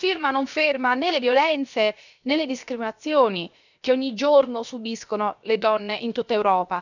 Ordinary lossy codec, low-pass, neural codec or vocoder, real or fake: none; 7.2 kHz; codec, 16 kHz, 0.7 kbps, FocalCodec; fake